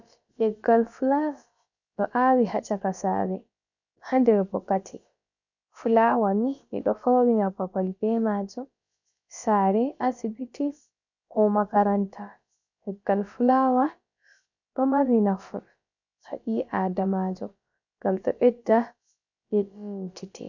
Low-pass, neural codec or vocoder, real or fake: 7.2 kHz; codec, 16 kHz, about 1 kbps, DyCAST, with the encoder's durations; fake